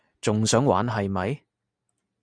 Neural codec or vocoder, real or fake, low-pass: none; real; 9.9 kHz